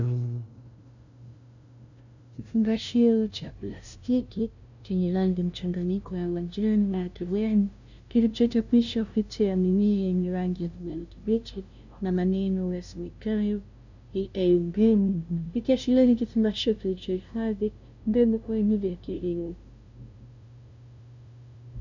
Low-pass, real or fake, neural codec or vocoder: 7.2 kHz; fake; codec, 16 kHz, 0.5 kbps, FunCodec, trained on LibriTTS, 25 frames a second